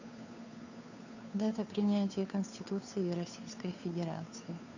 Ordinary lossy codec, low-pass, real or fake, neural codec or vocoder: none; 7.2 kHz; fake; codec, 16 kHz, 2 kbps, FunCodec, trained on Chinese and English, 25 frames a second